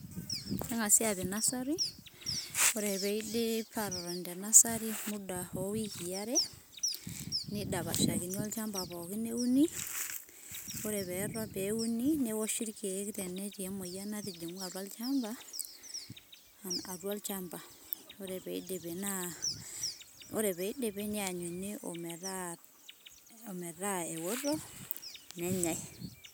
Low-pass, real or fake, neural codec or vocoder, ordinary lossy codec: none; real; none; none